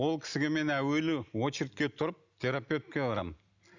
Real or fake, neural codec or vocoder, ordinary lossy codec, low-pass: real; none; none; 7.2 kHz